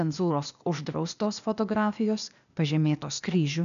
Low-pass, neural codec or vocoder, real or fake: 7.2 kHz; codec, 16 kHz, 0.8 kbps, ZipCodec; fake